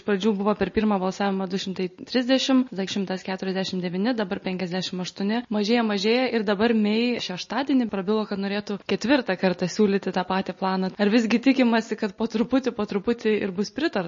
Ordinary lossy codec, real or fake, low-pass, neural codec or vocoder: MP3, 32 kbps; real; 7.2 kHz; none